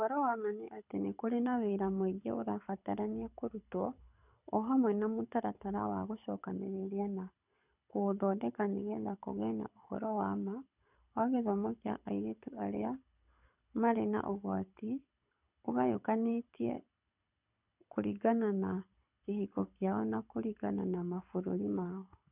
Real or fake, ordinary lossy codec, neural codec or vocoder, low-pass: fake; none; codec, 24 kHz, 6 kbps, HILCodec; 3.6 kHz